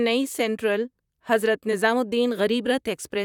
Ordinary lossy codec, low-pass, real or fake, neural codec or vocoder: none; 19.8 kHz; fake; vocoder, 44.1 kHz, 128 mel bands, Pupu-Vocoder